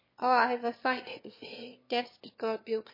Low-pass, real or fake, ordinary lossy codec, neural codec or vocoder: 5.4 kHz; fake; MP3, 24 kbps; autoencoder, 22.05 kHz, a latent of 192 numbers a frame, VITS, trained on one speaker